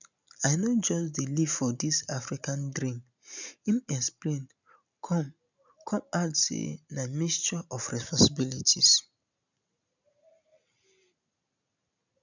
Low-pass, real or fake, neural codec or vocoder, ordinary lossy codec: 7.2 kHz; real; none; none